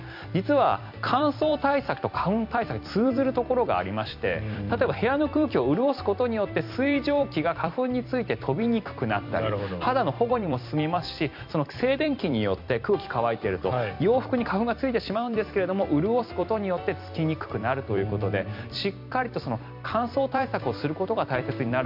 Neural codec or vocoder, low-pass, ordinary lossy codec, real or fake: vocoder, 44.1 kHz, 128 mel bands every 256 samples, BigVGAN v2; 5.4 kHz; MP3, 48 kbps; fake